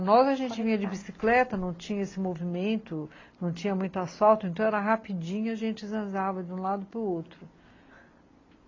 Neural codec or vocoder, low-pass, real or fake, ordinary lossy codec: none; 7.2 kHz; real; AAC, 32 kbps